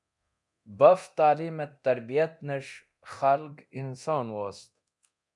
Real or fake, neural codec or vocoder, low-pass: fake; codec, 24 kHz, 0.9 kbps, DualCodec; 10.8 kHz